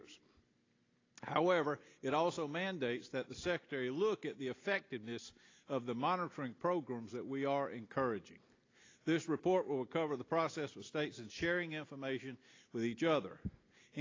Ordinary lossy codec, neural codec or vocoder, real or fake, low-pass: AAC, 32 kbps; none; real; 7.2 kHz